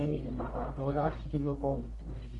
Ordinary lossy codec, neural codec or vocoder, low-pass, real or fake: none; codec, 44.1 kHz, 1.7 kbps, Pupu-Codec; 10.8 kHz; fake